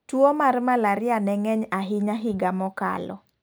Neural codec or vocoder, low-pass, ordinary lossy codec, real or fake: none; none; none; real